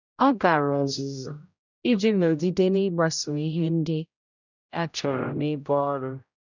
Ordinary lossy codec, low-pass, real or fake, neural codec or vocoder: none; 7.2 kHz; fake; codec, 16 kHz, 0.5 kbps, X-Codec, HuBERT features, trained on general audio